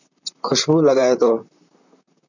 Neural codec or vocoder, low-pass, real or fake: vocoder, 44.1 kHz, 128 mel bands, Pupu-Vocoder; 7.2 kHz; fake